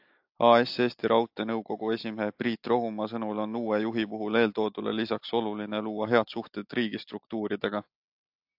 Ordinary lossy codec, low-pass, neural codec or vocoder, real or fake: AAC, 48 kbps; 5.4 kHz; none; real